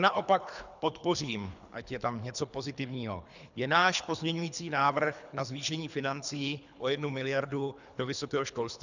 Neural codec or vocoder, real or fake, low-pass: codec, 24 kHz, 3 kbps, HILCodec; fake; 7.2 kHz